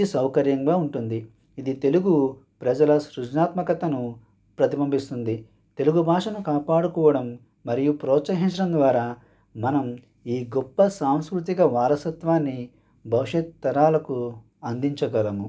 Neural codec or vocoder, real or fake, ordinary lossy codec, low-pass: none; real; none; none